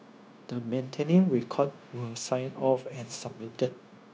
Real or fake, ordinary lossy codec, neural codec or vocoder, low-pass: fake; none; codec, 16 kHz, 0.9 kbps, LongCat-Audio-Codec; none